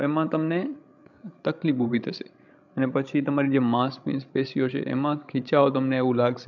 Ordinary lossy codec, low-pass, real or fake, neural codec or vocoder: none; 7.2 kHz; fake; codec, 16 kHz, 16 kbps, FreqCodec, larger model